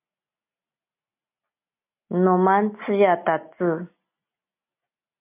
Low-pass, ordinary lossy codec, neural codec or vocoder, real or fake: 3.6 kHz; MP3, 32 kbps; none; real